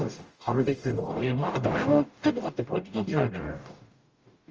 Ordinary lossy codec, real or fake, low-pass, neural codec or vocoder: Opus, 24 kbps; fake; 7.2 kHz; codec, 44.1 kHz, 0.9 kbps, DAC